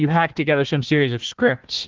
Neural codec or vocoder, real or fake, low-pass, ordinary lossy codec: codec, 16 kHz, 1 kbps, FunCodec, trained on Chinese and English, 50 frames a second; fake; 7.2 kHz; Opus, 16 kbps